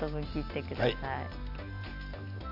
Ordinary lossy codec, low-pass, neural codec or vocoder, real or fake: none; 5.4 kHz; none; real